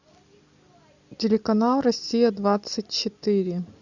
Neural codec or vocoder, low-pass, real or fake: none; 7.2 kHz; real